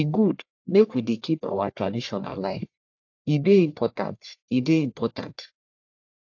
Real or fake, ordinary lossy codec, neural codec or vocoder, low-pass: fake; AAC, 48 kbps; codec, 44.1 kHz, 1.7 kbps, Pupu-Codec; 7.2 kHz